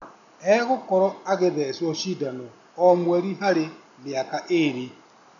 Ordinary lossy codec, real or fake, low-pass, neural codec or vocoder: none; real; 7.2 kHz; none